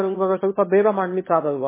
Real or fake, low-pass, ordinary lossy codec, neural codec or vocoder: fake; 3.6 kHz; MP3, 16 kbps; autoencoder, 22.05 kHz, a latent of 192 numbers a frame, VITS, trained on one speaker